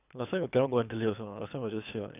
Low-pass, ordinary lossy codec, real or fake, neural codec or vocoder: 3.6 kHz; none; fake; codec, 24 kHz, 3 kbps, HILCodec